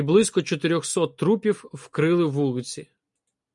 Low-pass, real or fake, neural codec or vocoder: 10.8 kHz; real; none